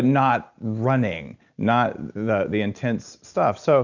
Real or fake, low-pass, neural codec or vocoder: real; 7.2 kHz; none